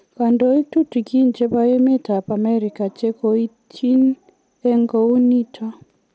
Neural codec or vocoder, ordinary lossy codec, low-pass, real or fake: none; none; none; real